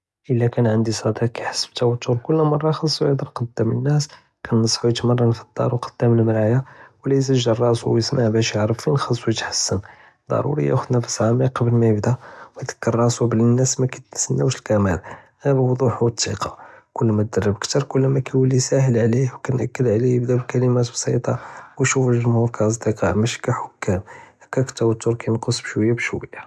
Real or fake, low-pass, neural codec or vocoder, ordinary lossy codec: real; none; none; none